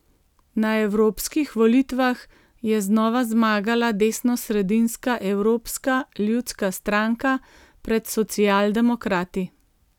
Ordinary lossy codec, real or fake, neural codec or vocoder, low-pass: none; real; none; 19.8 kHz